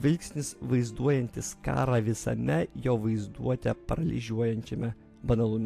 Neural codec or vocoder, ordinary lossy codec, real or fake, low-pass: none; AAC, 64 kbps; real; 14.4 kHz